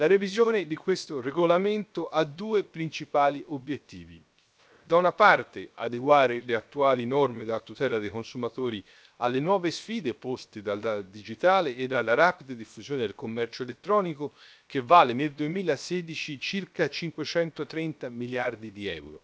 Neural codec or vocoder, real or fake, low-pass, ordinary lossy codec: codec, 16 kHz, 0.7 kbps, FocalCodec; fake; none; none